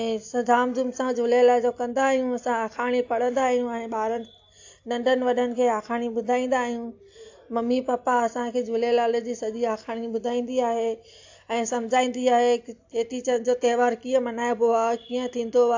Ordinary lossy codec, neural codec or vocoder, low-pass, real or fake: AAC, 48 kbps; none; 7.2 kHz; real